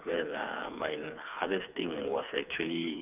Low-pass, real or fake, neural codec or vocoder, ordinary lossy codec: 3.6 kHz; fake; codec, 16 kHz, 4 kbps, FreqCodec, smaller model; none